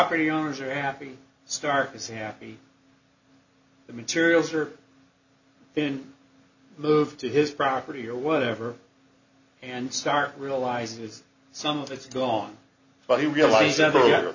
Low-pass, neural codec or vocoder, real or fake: 7.2 kHz; none; real